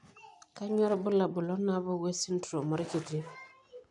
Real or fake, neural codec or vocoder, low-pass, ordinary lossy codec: real; none; 10.8 kHz; none